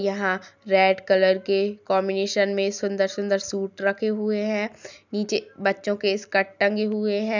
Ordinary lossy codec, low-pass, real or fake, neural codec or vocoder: none; 7.2 kHz; real; none